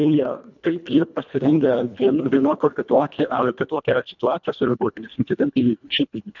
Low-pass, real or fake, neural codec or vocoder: 7.2 kHz; fake; codec, 24 kHz, 1.5 kbps, HILCodec